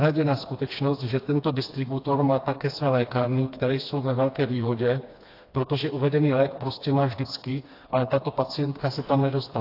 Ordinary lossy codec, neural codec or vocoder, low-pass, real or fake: AAC, 32 kbps; codec, 16 kHz, 2 kbps, FreqCodec, smaller model; 5.4 kHz; fake